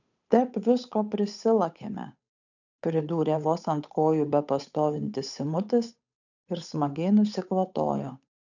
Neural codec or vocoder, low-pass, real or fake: codec, 16 kHz, 8 kbps, FunCodec, trained on Chinese and English, 25 frames a second; 7.2 kHz; fake